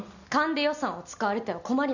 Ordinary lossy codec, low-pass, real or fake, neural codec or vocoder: none; 7.2 kHz; real; none